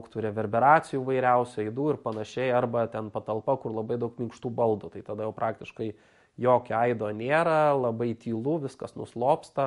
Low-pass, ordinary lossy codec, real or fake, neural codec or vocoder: 14.4 kHz; MP3, 48 kbps; real; none